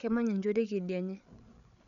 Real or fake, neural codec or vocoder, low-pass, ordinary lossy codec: fake; codec, 16 kHz, 8 kbps, FreqCodec, larger model; 7.2 kHz; none